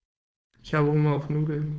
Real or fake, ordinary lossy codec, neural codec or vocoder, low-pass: fake; none; codec, 16 kHz, 4.8 kbps, FACodec; none